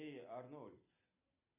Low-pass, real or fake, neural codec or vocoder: 3.6 kHz; real; none